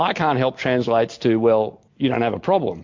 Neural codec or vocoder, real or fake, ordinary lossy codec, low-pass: none; real; MP3, 48 kbps; 7.2 kHz